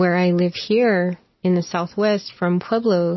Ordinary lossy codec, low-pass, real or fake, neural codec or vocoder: MP3, 24 kbps; 7.2 kHz; real; none